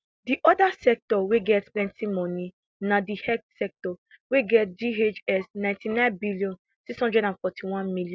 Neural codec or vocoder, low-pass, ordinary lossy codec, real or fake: none; none; none; real